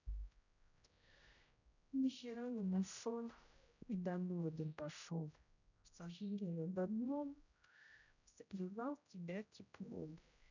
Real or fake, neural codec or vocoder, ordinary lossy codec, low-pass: fake; codec, 16 kHz, 0.5 kbps, X-Codec, HuBERT features, trained on general audio; none; 7.2 kHz